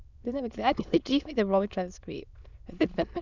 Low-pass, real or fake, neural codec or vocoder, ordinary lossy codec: 7.2 kHz; fake; autoencoder, 22.05 kHz, a latent of 192 numbers a frame, VITS, trained on many speakers; none